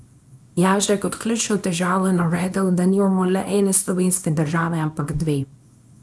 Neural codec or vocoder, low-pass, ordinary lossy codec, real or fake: codec, 24 kHz, 0.9 kbps, WavTokenizer, small release; none; none; fake